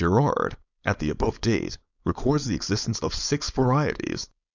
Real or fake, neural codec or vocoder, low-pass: fake; codec, 16 kHz, 4.8 kbps, FACodec; 7.2 kHz